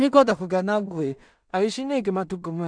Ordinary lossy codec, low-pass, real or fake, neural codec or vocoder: none; 9.9 kHz; fake; codec, 16 kHz in and 24 kHz out, 0.4 kbps, LongCat-Audio-Codec, two codebook decoder